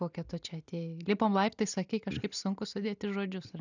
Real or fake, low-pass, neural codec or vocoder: real; 7.2 kHz; none